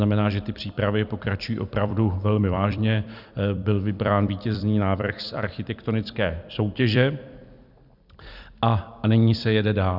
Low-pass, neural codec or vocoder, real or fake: 5.4 kHz; vocoder, 44.1 kHz, 128 mel bands every 256 samples, BigVGAN v2; fake